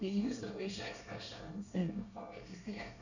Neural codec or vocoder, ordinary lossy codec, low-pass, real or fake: codec, 24 kHz, 1 kbps, SNAC; none; 7.2 kHz; fake